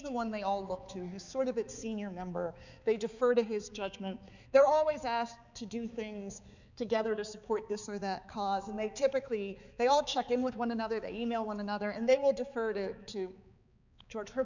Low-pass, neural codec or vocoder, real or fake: 7.2 kHz; codec, 16 kHz, 4 kbps, X-Codec, HuBERT features, trained on balanced general audio; fake